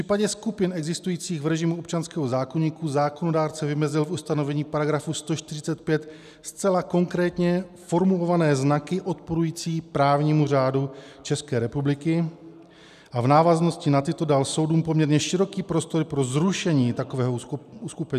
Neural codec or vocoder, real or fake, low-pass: none; real; 14.4 kHz